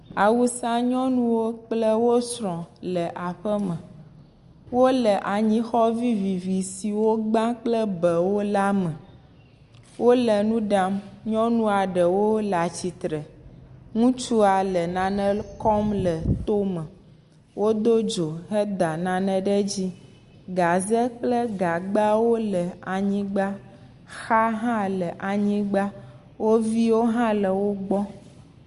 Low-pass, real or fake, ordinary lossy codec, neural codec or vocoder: 10.8 kHz; real; Opus, 64 kbps; none